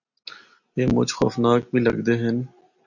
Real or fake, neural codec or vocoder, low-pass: real; none; 7.2 kHz